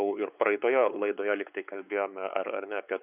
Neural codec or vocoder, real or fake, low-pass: codec, 16 kHz, 4 kbps, X-Codec, WavLM features, trained on Multilingual LibriSpeech; fake; 3.6 kHz